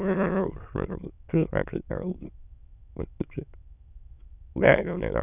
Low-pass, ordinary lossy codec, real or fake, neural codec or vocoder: 3.6 kHz; none; fake; autoencoder, 22.05 kHz, a latent of 192 numbers a frame, VITS, trained on many speakers